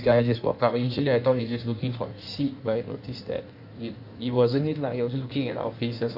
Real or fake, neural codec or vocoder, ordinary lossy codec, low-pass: fake; codec, 16 kHz in and 24 kHz out, 1.1 kbps, FireRedTTS-2 codec; AAC, 48 kbps; 5.4 kHz